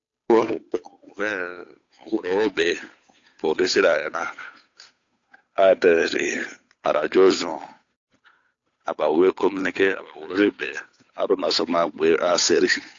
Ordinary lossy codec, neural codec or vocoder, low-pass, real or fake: AAC, 48 kbps; codec, 16 kHz, 8 kbps, FunCodec, trained on Chinese and English, 25 frames a second; 7.2 kHz; fake